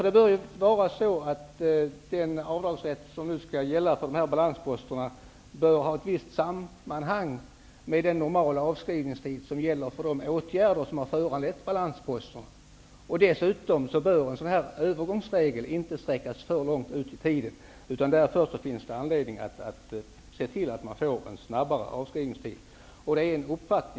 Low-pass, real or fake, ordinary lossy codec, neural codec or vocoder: none; real; none; none